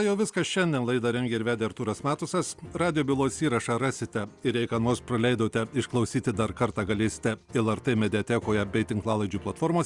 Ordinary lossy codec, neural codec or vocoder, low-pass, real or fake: Opus, 64 kbps; none; 10.8 kHz; real